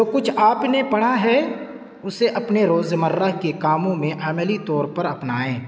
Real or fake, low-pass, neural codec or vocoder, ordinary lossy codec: real; none; none; none